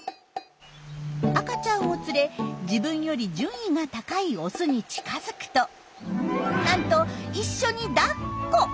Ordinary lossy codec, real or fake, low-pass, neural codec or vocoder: none; real; none; none